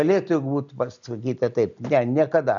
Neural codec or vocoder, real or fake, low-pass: none; real; 7.2 kHz